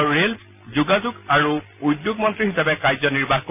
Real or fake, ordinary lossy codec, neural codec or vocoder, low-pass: real; none; none; 3.6 kHz